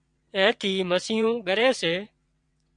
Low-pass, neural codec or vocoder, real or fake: 9.9 kHz; vocoder, 22.05 kHz, 80 mel bands, WaveNeXt; fake